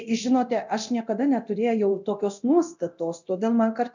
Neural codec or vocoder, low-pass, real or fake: codec, 24 kHz, 0.9 kbps, DualCodec; 7.2 kHz; fake